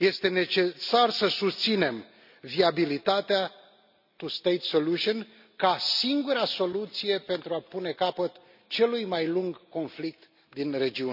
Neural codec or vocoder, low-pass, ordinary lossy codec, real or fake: none; 5.4 kHz; none; real